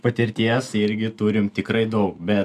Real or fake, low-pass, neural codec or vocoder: real; 14.4 kHz; none